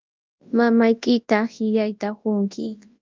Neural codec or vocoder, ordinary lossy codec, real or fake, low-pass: codec, 24 kHz, 0.9 kbps, WavTokenizer, large speech release; Opus, 24 kbps; fake; 7.2 kHz